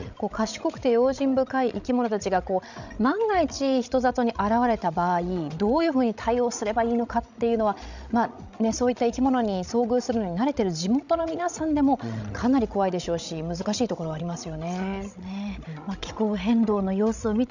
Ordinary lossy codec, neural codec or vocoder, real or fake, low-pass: none; codec, 16 kHz, 16 kbps, FreqCodec, larger model; fake; 7.2 kHz